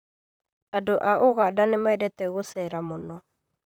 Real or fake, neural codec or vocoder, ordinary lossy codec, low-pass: fake; vocoder, 44.1 kHz, 128 mel bands, Pupu-Vocoder; none; none